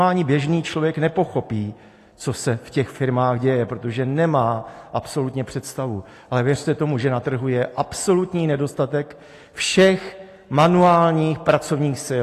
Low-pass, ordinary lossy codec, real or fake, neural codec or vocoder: 14.4 kHz; AAC, 48 kbps; real; none